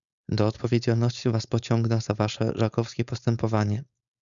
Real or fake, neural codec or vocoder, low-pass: fake; codec, 16 kHz, 4.8 kbps, FACodec; 7.2 kHz